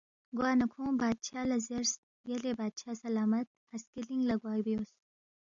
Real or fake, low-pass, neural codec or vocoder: real; 7.2 kHz; none